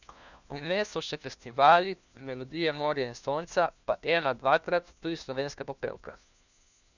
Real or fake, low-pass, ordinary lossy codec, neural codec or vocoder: fake; 7.2 kHz; none; codec, 16 kHz, 1 kbps, FunCodec, trained on LibriTTS, 50 frames a second